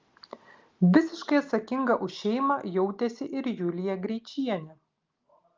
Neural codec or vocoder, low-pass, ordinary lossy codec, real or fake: none; 7.2 kHz; Opus, 32 kbps; real